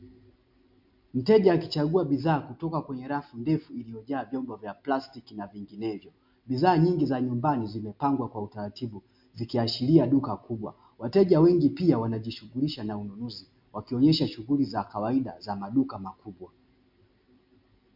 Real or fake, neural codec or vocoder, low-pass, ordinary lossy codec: real; none; 5.4 kHz; MP3, 48 kbps